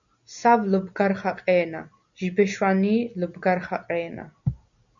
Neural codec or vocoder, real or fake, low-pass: none; real; 7.2 kHz